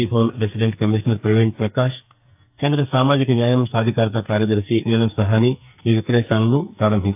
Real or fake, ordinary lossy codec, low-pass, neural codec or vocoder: fake; AAC, 32 kbps; 3.6 kHz; codec, 44.1 kHz, 2.6 kbps, SNAC